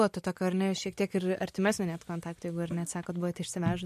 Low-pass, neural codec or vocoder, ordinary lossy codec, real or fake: 14.4 kHz; none; MP3, 64 kbps; real